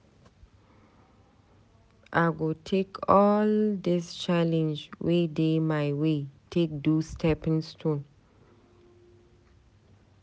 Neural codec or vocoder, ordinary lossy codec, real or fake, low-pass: none; none; real; none